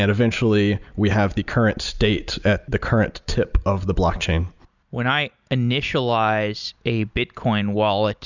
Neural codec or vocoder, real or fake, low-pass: none; real; 7.2 kHz